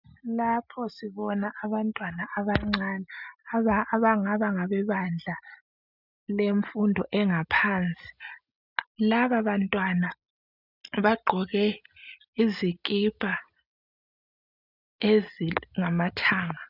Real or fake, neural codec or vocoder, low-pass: real; none; 5.4 kHz